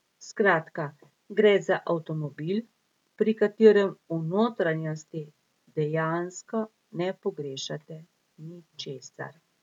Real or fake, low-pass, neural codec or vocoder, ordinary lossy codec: real; 19.8 kHz; none; none